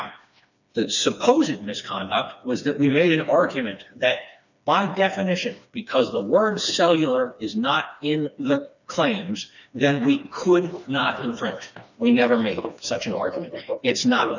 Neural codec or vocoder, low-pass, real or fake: codec, 16 kHz, 2 kbps, FreqCodec, smaller model; 7.2 kHz; fake